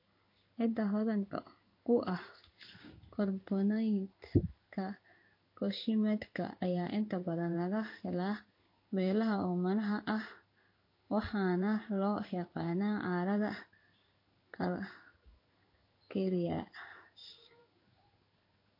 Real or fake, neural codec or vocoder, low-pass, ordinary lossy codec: fake; codec, 16 kHz in and 24 kHz out, 1 kbps, XY-Tokenizer; 5.4 kHz; MP3, 32 kbps